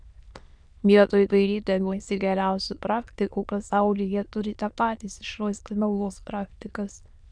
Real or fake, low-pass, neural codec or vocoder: fake; 9.9 kHz; autoencoder, 22.05 kHz, a latent of 192 numbers a frame, VITS, trained on many speakers